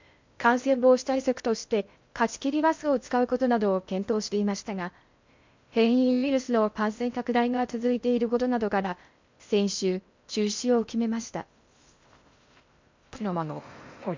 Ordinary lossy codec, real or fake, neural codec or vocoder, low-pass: MP3, 64 kbps; fake; codec, 16 kHz in and 24 kHz out, 0.6 kbps, FocalCodec, streaming, 2048 codes; 7.2 kHz